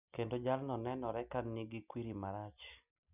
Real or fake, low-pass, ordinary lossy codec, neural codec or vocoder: real; 3.6 kHz; none; none